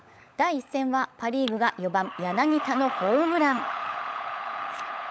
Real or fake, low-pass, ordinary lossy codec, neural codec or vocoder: fake; none; none; codec, 16 kHz, 16 kbps, FunCodec, trained on LibriTTS, 50 frames a second